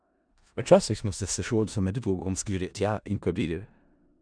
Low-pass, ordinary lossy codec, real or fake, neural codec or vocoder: 9.9 kHz; none; fake; codec, 16 kHz in and 24 kHz out, 0.4 kbps, LongCat-Audio-Codec, four codebook decoder